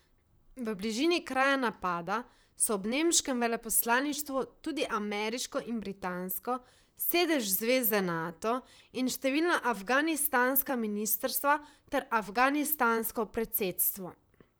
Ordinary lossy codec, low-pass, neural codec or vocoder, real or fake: none; none; vocoder, 44.1 kHz, 128 mel bands, Pupu-Vocoder; fake